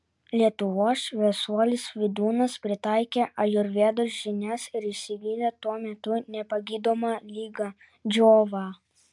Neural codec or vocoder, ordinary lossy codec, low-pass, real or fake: none; MP3, 96 kbps; 10.8 kHz; real